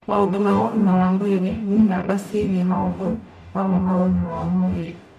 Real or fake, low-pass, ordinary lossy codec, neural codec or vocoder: fake; 14.4 kHz; none; codec, 44.1 kHz, 0.9 kbps, DAC